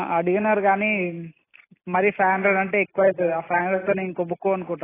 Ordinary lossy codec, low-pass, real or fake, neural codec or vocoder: AAC, 16 kbps; 3.6 kHz; real; none